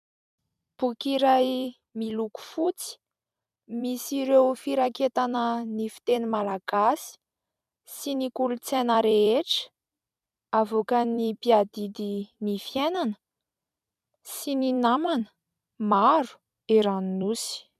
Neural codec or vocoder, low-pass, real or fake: vocoder, 44.1 kHz, 128 mel bands every 256 samples, BigVGAN v2; 14.4 kHz; fake